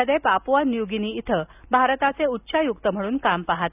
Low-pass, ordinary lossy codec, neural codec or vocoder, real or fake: 3.6 kHz; none; none; real